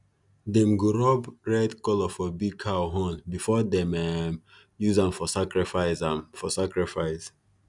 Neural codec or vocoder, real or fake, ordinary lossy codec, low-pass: none; real; none; 10.8 kHz